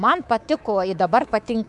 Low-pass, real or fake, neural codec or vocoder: 10.8 kHz; fake; codec, 24 kHz, 3.1 kbps, DualCodec